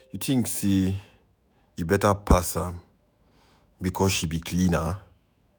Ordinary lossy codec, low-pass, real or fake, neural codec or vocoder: none; none; fake; autoencoder, 48 kHz, 128 numbers a frame, DAC-VAE, trained on Japanese speech